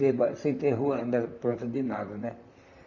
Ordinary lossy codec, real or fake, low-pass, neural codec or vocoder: none; fake; 7.2 kHz; vocoder, 44.1 kHz, 128 mel bands, Pupu-Vocoder